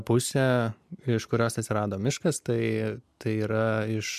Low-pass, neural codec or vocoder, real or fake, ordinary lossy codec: 14.4 kHz; none; real; MP3, 96 kbps